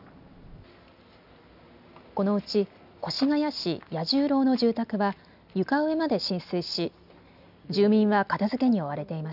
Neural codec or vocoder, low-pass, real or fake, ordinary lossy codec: none; 5.4 kHz; real; none